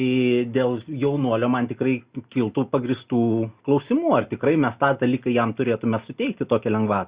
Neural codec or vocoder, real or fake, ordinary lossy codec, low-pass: none; real; Opus, 64 kbps; 3.6 kHz